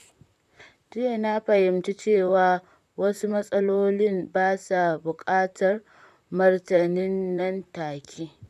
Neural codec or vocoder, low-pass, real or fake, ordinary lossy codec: vocoder, 44.1 kHz, 128 mel bands, Pupu-Vocoder; 14.4 kHz; fake; none